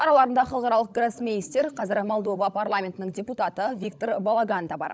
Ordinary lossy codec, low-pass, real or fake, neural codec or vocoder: none; none; fake; codec, 16 kHz, 16 kbps, FunCodec, trained on LibriTTS, 50 frames a second